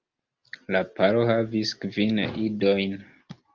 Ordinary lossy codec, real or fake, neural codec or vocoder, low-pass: Opus, 32 kbps; real; none; 7.2 kHz